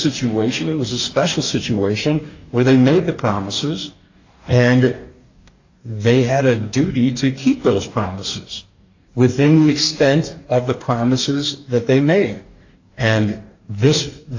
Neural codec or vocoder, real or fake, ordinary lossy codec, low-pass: codec, 44.1 kHz, 2.6 kbps, DAC; fake; AAC, 48 kbps; 7.2 kHz